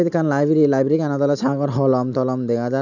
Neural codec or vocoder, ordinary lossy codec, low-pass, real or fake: none; none; 7.2 kHz; real